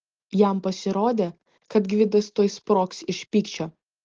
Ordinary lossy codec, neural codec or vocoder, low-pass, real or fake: Opus, 16 kbps; none; 7.2 kHz; real